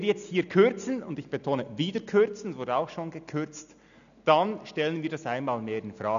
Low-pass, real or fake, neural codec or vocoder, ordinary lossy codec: 7.2 kHz; real; none; none